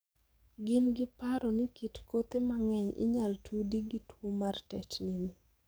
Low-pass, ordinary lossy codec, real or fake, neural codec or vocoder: none; none; fake; codec, 44.1 kHz, 7.8 kbps, DAC